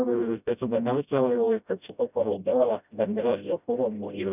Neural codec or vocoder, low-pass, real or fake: codec, 16 kHz, 0.5 kbps, FreqCodec, smaller model; 3.6 kHz; fake